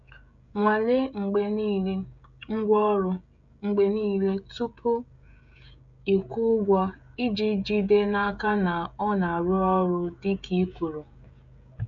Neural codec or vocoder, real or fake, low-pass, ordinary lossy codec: codec, 16 kHz, 16 kbps, FreqCodec, smaller model; fake; 7.2 kHz; none